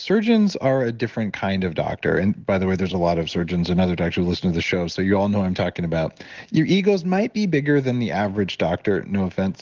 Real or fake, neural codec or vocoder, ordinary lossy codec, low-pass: real; none; Opus, 16 kbps; 7.2 kHz